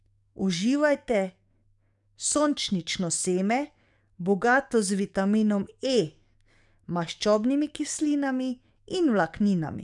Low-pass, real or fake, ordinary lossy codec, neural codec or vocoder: 10.8 kHz; fake; none; codec, 44.1 kHz, 7.8 kbps, DAC